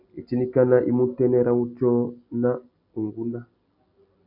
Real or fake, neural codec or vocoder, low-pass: real; none; 5.4 kHz